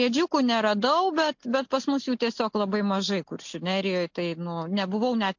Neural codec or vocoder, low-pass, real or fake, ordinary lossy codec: none; 7.2 kHz; real; MP3, 48 kbps